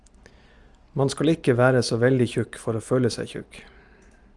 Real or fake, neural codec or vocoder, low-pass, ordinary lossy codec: real; none; 10.8 kHz; Opus, 32 kbps